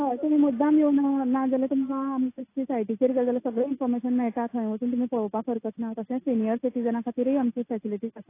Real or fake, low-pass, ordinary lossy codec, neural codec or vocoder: real; 3.6 kHz; AAC, 24 kbps; none